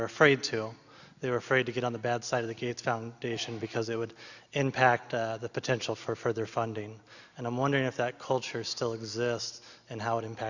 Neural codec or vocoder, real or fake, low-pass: none; real; 7.2 kHz